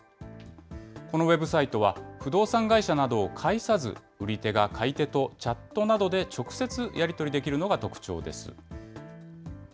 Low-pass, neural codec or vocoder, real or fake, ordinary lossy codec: none; none; real; none